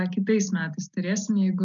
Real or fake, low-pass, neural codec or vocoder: real; 7.2 kHz; none